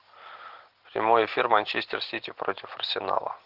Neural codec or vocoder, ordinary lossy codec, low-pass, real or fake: none; Opus, 16 kbps; 5.4 kHz; real